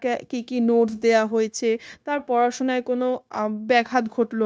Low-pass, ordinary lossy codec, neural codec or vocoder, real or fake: none; none; codec, 16 kHz, 0.9 kbps, LongCat-Audio-Codec; fake